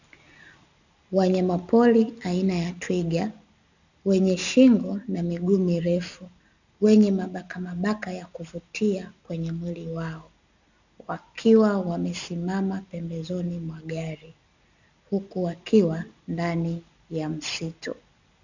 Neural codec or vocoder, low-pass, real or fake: none; 7.2 kHz; real